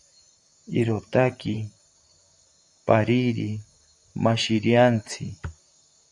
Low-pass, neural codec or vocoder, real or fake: 10.8 kHz; vocoder, 44.1 kHz, 128 mel bands, Pupu-Vocoder; fake